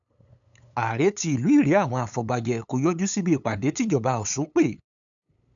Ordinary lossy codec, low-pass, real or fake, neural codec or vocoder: none; 7.2 kHz; fake; codec, 16 kHz, 8 kbps, FunCodec, trained on LibriTTS, 25 frames a second